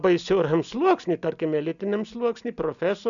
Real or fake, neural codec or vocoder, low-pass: real; none; 7.2 kHz